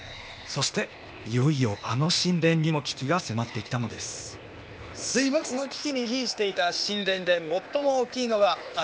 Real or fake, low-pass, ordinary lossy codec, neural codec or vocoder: fake; none; none; codec, 16 kHz, 0.8 kbps, ZipCodec